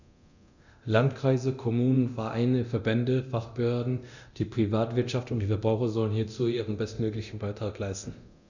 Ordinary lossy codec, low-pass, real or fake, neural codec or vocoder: none; 7.2 kHz; fake; codec, 24 kHz, 0.9 kbps, DualCodec